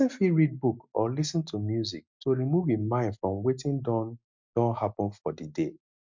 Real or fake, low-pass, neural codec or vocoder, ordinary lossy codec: real; 7.2 kHz; none; MP3, 64 kbps